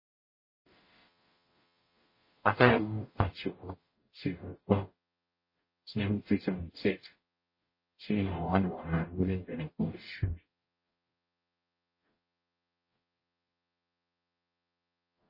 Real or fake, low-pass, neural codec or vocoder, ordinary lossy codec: fake; 5.4 kHz; codec, 44.1 kHz, 0.9 kbps, DAC; MP3, 24 kbps